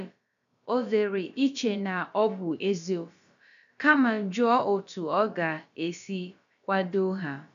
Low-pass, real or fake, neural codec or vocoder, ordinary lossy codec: 7.2 kHz; fake; codec, 16 kHz, about 1 kbps, DyCAST, with the encoder's durations; none